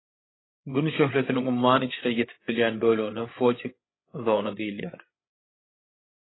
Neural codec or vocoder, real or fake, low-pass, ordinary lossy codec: codec, 16 kHz, 8 kbps, FreqCodec, larger model; fake; 7.2 kHz; AAC, 16 kbps